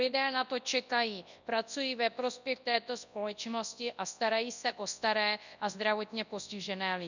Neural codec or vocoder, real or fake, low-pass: codec, 24 kHz, 0.9 kbps, WavTokenizer, large speech release; fake; 7.2 kHz